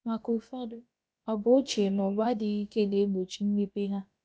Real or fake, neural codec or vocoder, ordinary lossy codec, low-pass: fake; codec, 16 kHz, about 1 kbps, DyCAST, with the encoder's durations; none; none